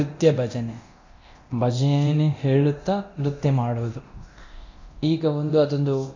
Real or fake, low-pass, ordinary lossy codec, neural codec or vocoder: fake; 7.2 kHz; AAC, 48 kbps; codec, 24 kHz, 0.9 kbps, DualCodec